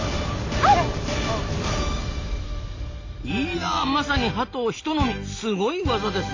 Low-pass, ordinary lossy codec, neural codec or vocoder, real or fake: 7.2 kHz; AAC, 48 kbps; none; real